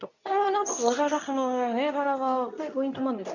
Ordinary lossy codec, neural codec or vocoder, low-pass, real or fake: none; codec, 24 kHz, 0.9 kbps, WavTokenizer, medium speech release version 2; 7.2 kHz; fake